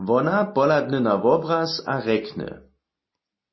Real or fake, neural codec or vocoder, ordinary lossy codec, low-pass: real; none; MP3, 24 kbps; 7.2 kHz